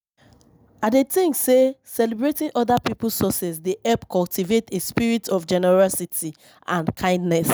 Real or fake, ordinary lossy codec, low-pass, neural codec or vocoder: real; none; none; none